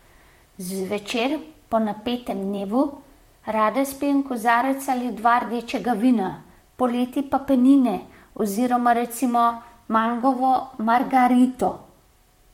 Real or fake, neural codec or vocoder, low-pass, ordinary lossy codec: fake; vocoder, 44.1 kHz, 128 mel bands, Pupu-Vocoder; 19.8 kHz; MP3, 64 kbps